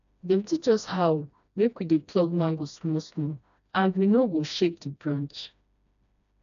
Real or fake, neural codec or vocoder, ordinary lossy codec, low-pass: fake; codec, 16 kHz, 1 kbps, FreqCodec, smaller model; none; 7.2 kHz